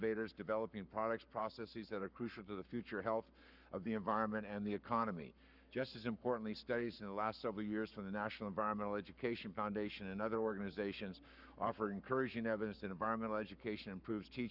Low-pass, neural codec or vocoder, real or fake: 5.4 kHz; codec, 44.1 kHz, 7.8 kbps, Pupu-Codec; fake